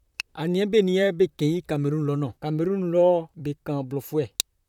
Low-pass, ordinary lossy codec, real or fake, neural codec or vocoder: 19.8 kHz; none; fake; vocoder, 44.1 kHz, 128 mel bands, Pupu-Vocoder